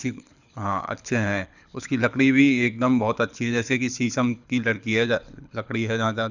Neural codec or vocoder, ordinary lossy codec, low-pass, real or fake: codec, 24 kHz, 6 kbps, HILCodec; none; 7.2 kHz; fake